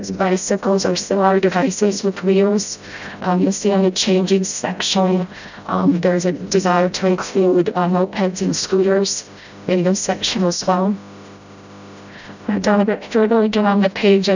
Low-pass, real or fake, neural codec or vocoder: 7.2 kHz; fake; codec, 16 kHz, 0.5 kbps, FreqCodec, smaller model